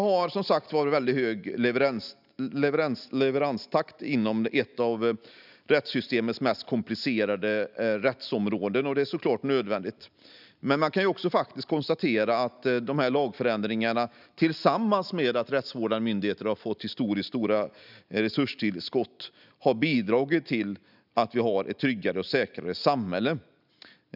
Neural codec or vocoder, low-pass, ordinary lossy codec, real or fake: none; 5.4 kHz; none; real